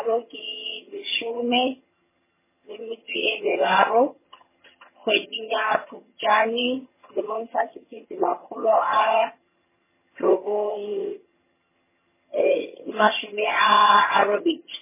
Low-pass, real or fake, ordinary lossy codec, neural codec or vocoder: 3.6 kHz; fake; MP3, 16 kbps; vocoder, 22.05 kHz, 80 mel bands, HiFi-GAN